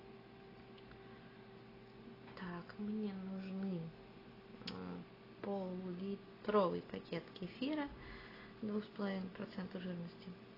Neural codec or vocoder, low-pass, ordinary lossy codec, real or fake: none; 5.4 kHz; AAC, 24 kbps; real